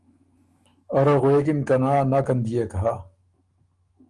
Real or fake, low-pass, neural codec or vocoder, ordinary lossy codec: fake; 10.8 kHz; autoencoder, 48 kHz, 128 numbers a frame, DAC-VAE, trained on Japanese speech; Opus, 32 kbps